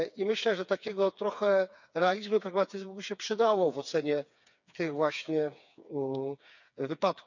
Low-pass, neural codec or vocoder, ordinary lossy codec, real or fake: 7.2 kHz; codec, 16 kHz, 4 kbps, FreqCodec, smaller model; none; fake